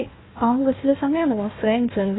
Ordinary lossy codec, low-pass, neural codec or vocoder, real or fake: AAC, 16 kbps; 7.2 kHz; codec, 16 kHz, 1 kbps, FunCodec, trained on Chinese and English, 50 frames a second; fake